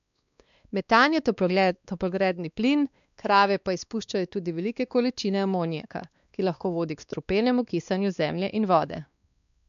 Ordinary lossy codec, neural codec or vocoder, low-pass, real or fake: none; codec, 16 kHz, 2 kbps, X-Codec, WavLM features, trained on Multilingual LibriSpeech; 7.2 kHz; fake